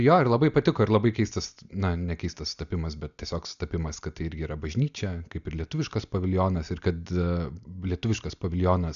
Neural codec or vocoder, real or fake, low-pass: none; real; 7.2 kHz